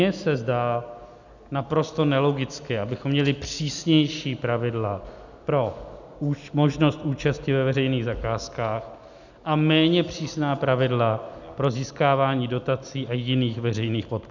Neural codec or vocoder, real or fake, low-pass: none; real; 7.2 kHz